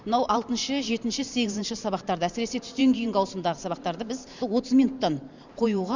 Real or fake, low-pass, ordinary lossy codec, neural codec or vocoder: fake; 7.2 kHz; Opus, 64 kbps; vocoder, 44.1 kHz, 128 mel bands every 512 samples, BigVGAN v2